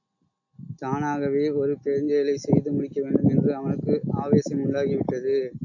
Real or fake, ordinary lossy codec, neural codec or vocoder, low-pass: real; AAC, 48 kbps; none; 7.2 kHz